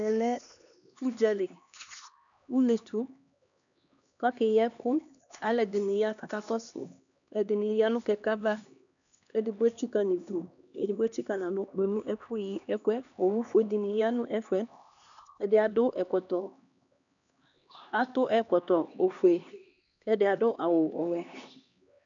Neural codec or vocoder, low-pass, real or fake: codec, 16 kHz, 2 kbps, X-Codec, HuBERT features, trained on LibriSpeech; 7.2 kHz; fake